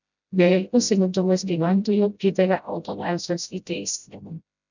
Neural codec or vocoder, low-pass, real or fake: codec, 16 kHz, 0.5 kbps, FreqCodec, smaller model; 7.2 kHz; fake